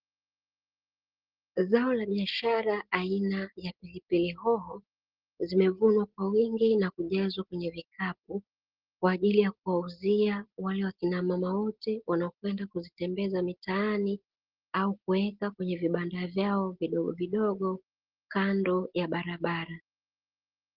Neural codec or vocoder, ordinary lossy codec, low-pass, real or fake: none; Opus, 16 kbps; 5.4 kHz; real